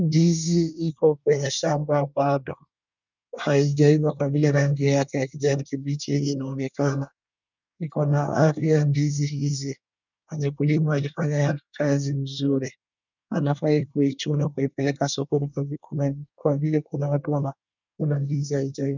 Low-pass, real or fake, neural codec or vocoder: 7.2 kHz; fake; codec, 24 kHz, 1 kbps, SNAC